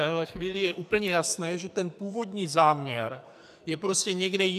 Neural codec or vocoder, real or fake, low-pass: codec, 44.1 kHz, 2.6 kbps, SNAC; fake; 14.4 kHz